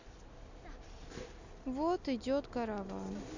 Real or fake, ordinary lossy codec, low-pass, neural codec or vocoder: real; AAC, 48 kbps; 7.2 kHz; none